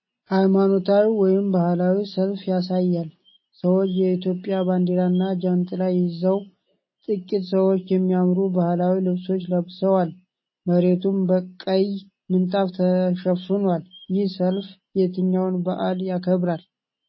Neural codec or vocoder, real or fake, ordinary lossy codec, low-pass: none; real; MP3, 24 kbps; 7.2 kHz